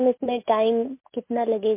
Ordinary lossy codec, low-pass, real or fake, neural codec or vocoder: MP3, 24 kbps; 3.6 kHz; real; none